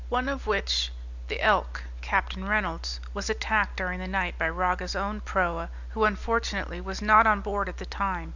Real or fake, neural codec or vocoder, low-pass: real; none; 7.2 kHz